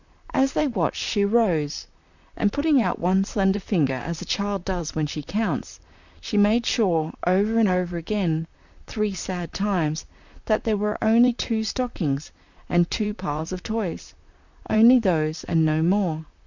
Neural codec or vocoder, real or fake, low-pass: vocoder, 44.1 kHz, 128 mel bands, Pupu-Vocoder; fake; 7.2 kHz